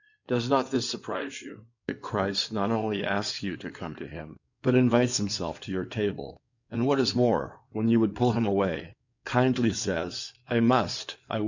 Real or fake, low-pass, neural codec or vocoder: fake; 7.2 kHz; codec, 16 kHz in and 24 kHz out, 2.2 kbps, FireRedTTS-2 codec